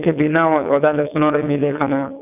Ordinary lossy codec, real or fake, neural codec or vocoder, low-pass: none; fake; vocoder, 22.05 kHz, 80 mel bands, WaveNeXt; 3.6 kHz